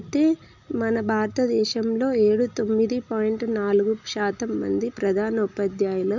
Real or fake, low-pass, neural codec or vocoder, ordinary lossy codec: real; 7.2 kHz; none; none